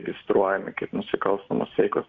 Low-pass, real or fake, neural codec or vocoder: 7.2 kHz; real; none